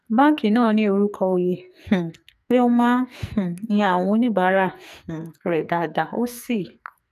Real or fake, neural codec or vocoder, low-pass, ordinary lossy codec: fake; codec, 44.1 kHz, 2.6 kbps, SNAC; 14.4 kHz; none